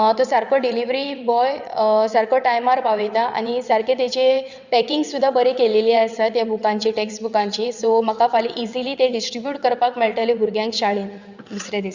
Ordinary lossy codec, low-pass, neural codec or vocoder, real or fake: Opus, 64 kbps; 7.2 kHz; vocoder, 22.05 kHz, 80 mel bands, WaveNeXt; fake